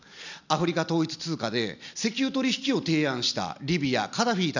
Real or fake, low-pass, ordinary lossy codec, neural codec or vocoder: real; 7.2 kHz; none; none